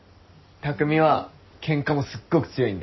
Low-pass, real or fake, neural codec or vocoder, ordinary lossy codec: 7.2 kHz; real; none; MP3, 24 kbps